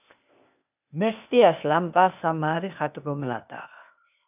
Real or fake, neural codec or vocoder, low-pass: fake; codec, 16 kHz, 0.8 kbps, ZipCodec; 3.6 kHz